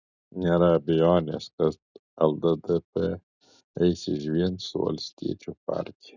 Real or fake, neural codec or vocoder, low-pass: real; none; 7.2 kHz